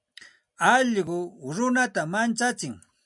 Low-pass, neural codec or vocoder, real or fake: 10.8 kHz; none; real